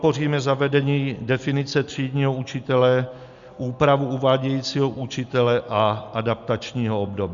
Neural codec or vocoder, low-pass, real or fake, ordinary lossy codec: none; 7.2 kHz; real; Opus, 64 kbps